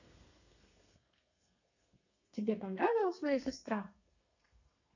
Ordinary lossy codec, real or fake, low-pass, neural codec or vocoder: none; fake; 7.2 kHz; codec, 32 kHz, 1.9 kbps, SNAC